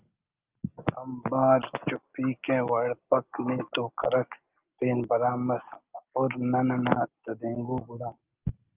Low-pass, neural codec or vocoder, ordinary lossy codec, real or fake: 3.6 kHz; none; Opus, 32 kbps; real